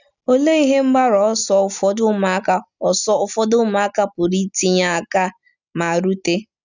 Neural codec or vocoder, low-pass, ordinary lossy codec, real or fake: none; 7.2 kHz; none; real